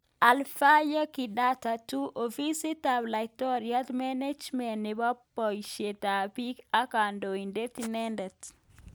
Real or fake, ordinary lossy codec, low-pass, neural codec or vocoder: real; none; none; none